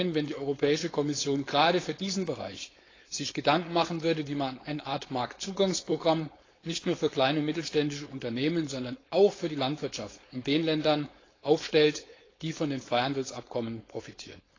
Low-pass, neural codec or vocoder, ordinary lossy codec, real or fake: 7.2 kHz; codec, 16 kHz, 4.8 kbps, FACodec; AAC, 32 kbps; fake